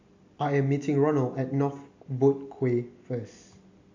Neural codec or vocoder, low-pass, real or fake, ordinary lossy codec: none; 7.2 kHz; real; AAC, 48 kbps